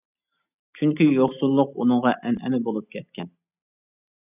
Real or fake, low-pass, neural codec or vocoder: real; 3.6 kHz; none